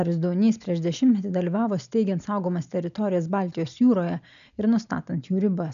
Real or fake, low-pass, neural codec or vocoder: real; 7.2 kHz; none